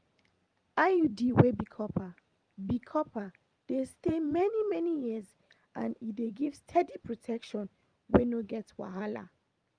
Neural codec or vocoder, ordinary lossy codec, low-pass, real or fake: vocoder, 44.1 kHz, 128 mel bands every 512 samples, BigVGAN v2; Opus, 32 kbps; 9.9 kHz; fake